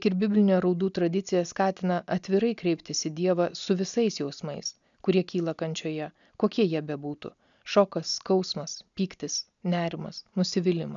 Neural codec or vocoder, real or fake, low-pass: none; real; 7.2 kHz